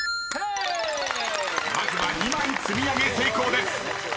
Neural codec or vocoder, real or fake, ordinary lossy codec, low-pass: none; real; none; none